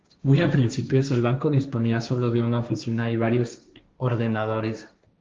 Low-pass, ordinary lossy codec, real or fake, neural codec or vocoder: 7.2 kHz; Opus, 32 kbps; fake; codec, 16 kHz, 1.1 kbps, Voila-Tokenizer